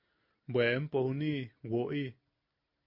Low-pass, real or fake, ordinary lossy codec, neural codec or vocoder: 5.4 kHz; real; MP3, 32 kbps; none